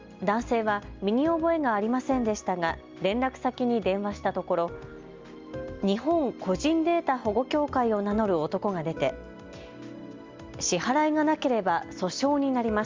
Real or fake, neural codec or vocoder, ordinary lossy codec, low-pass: real; none; Opus, 32 kbps; 7.2 kHz